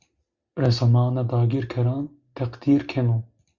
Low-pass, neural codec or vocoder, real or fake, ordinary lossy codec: 7.2 kHz; none; real; Opus, 64 kbps